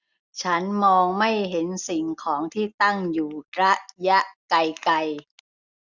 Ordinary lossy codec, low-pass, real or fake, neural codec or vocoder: none; 7.2 kHz; real; none